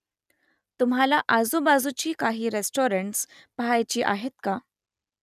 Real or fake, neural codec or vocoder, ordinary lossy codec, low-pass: real; none; none; 14.4 kHz